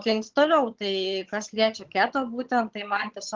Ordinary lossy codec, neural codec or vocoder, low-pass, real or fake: Opus, 16 kbps; vocoder, 22.05 kHz, 80 mel bands, HiFi-GAN; 7.2 kHz; fake